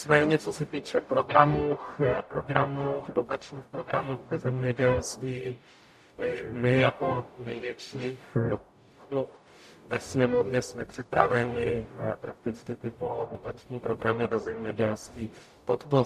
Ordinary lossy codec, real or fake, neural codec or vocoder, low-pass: AAC, 96 kbps; fake; codec, 44.1 kHz, 0.9 kbps, DAC; 14.4 kHz